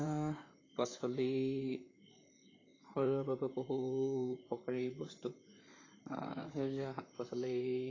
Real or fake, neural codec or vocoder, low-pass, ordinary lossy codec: fake; vocoder, 44.1 kHz, 128 mel bands, Pupu-Vocoder; 7.2 kHz; AAC, 32 kbps